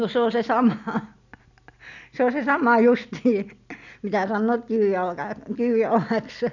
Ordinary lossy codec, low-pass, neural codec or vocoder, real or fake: none; 7.2 kHz; none; real